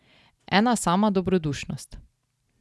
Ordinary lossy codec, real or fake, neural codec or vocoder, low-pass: none; real; none; none